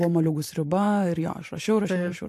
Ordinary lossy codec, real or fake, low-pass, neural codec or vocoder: AAC, 64 kbps; fake; 14.4 kHz; vocoder, 44.1 kHz, 128 mel bands every 512 samples, BigVGAN v2